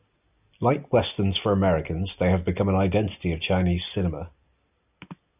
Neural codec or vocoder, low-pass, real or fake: none; 3.6 kHz; real